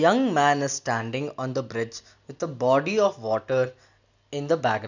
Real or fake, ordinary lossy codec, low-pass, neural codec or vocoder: real; none; 7.2 kHz; none